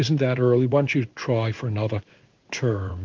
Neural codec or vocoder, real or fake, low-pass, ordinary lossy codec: codec, 16 kHz in and 24 kHz out, 1 kbps, XY-Tokenizer; fake; 7.2 kHz; Opus, 32 kbps